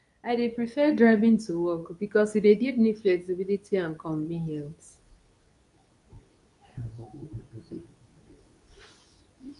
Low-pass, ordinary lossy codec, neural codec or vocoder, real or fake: 10.8 kHz; none; codec, 24 kHz, 0.9 kbps, WavTokenizer, medium speech release version 2; fake